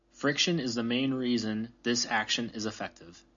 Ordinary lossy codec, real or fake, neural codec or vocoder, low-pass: AAC, 64 kbps; real; none; 7.2 kHz